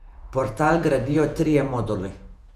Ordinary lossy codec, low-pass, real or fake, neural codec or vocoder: none; 14.4 kHz; fake; vocoder, 48 kHz, 128 mel bands, Vocos